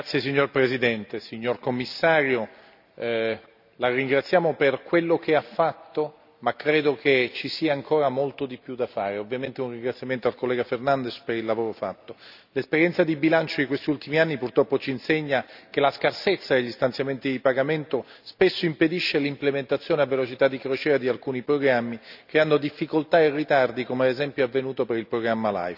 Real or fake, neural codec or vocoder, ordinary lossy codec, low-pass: real; none; none; 5.4 kHz